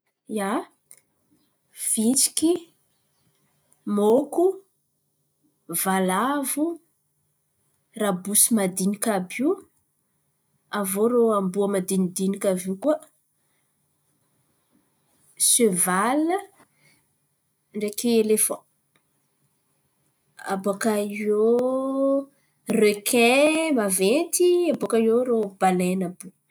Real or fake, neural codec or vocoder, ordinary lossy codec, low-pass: real; none; none; none